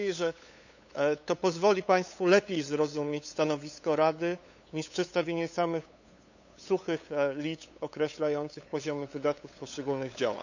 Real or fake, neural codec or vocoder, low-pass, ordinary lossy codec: fake; codec, 16 kHz, 8 kbps, FunCodec, trained on LibriTTS, 25 frames a second; 7.2 kHz; none